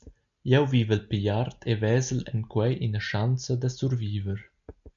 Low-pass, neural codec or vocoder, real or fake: 7.2 kHz; none; real